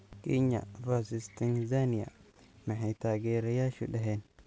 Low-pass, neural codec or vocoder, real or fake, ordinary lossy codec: none; none; real; none